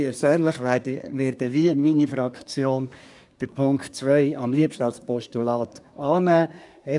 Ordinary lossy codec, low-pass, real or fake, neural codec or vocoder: none; 10.8 kHz; fake; codec, 24 kHz, 1 kbps, SNAC